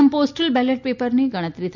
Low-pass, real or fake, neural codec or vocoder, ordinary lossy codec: 7.2 kHz; real; none; none